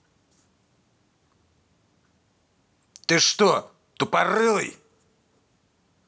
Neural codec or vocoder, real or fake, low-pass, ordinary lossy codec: none; real; none; none